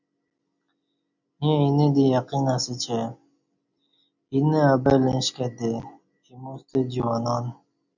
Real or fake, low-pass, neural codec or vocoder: real; 7.2 kHz; none